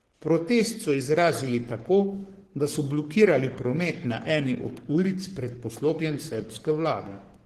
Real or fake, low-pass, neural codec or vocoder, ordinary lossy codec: fake; 14.4 kHz; codec, 44.1 kHz, 3.4 kbps, Pupu-Codec; Opus, 16 kbps